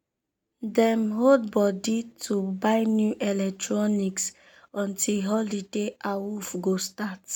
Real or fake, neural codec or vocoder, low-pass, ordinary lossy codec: real; none; 19.8 kHz; none